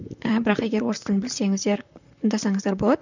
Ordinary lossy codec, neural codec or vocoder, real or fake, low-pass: none; vocoder, 44.1 kHz, 128 mel bands, Pupu-Vocoder; fake; 7.2 kHz